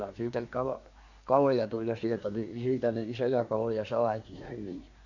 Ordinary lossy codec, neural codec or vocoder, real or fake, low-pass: none; codec, 24 kHz, 1 kbps, SNAC; fake; 7.2 kHz